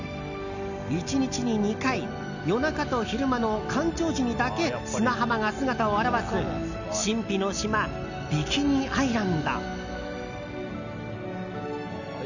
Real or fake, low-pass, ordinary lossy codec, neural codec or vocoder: real; 7.2 kHz; none; none